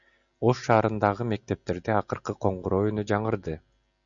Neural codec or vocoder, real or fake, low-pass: none; real; 7.2 kHz